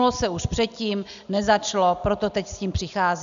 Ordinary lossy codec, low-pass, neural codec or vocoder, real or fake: AAC, 96 kbps; 7.2 kHz; none; real